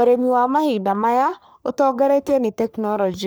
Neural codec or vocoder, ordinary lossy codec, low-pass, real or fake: codec, 44.1 kHz, 3.4 kbps, Pupu-Codec; none; none; fake